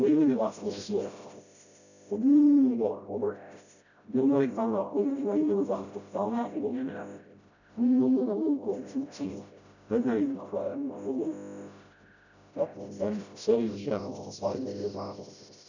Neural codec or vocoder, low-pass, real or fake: codec, 16 kHz, 0.5 kbps, FreqCodec, smaller model; 7.2 kHz; fake